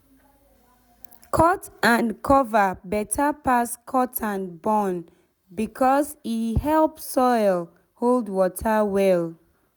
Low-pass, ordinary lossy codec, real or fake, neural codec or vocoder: none; none; real; none